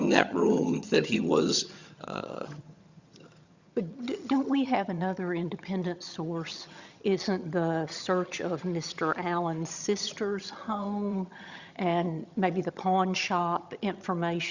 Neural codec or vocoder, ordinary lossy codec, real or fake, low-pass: vocoder, 22.05 kHz, 80 mel bands, HiFi-GAN; Opus, 64 kbps; fake; 7.2 kHz